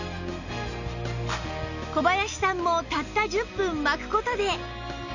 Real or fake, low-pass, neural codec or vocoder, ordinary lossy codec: real; 7.2 kHz; none; none